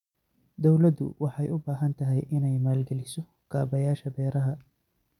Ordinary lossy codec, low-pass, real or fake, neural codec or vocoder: none; 19.8 kHz; real; none